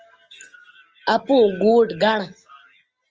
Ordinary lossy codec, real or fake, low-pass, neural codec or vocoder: Opus, 24 kbps; real; 7.2 kHz; none